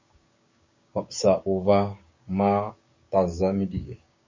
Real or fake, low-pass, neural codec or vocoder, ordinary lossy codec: fake; 7.2 kHz; codec, 16 kHz, 6 kbps, DAC; MP3, 32 kbps